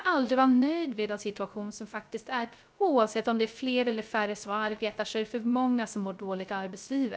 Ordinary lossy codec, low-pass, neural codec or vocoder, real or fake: none; none; codec, 16 kHz, 0.3 kbps, FocalCodec; fake